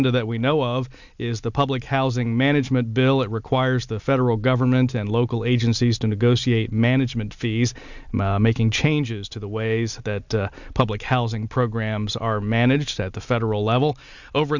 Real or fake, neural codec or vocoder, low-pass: real; none; 7.2 kHz